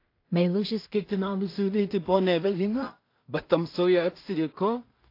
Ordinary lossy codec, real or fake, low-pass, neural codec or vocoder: AAC, 32 kbps; fake; 5.4 kHz; codec, 16 kHz in and 24 kHz out, 0.4 kbps, LongCat-Audio-Codec, two codebook decoder